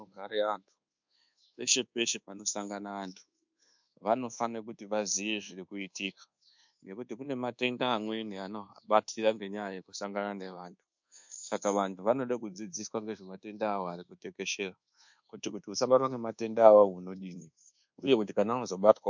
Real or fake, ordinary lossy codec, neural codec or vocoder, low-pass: fake; MP3, 64 kbps; codec, 24 kHz, 1.2 kbps, DualCodec; 7.2 kHz